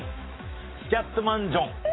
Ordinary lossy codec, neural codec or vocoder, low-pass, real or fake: AAC, 16 kbps; autoencoder, 48 kHz, 128 numbers a frame, DAC-VAE, trained on Japanese speech; 7.2 kHz; fake